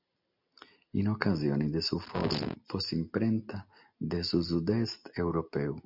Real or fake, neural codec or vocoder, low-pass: real; none; 5.4 kHz